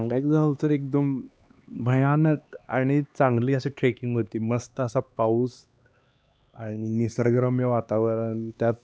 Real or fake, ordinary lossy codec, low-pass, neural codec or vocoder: fake; none; none; codec, 16 kHz, 2 kbps, X-Codec, HuBERT features, trained on LibriSpeech